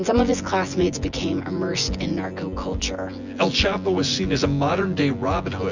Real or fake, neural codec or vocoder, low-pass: fake; vocoder, 24 kHz, 100 mel bands, Vocos; 7.2 kHz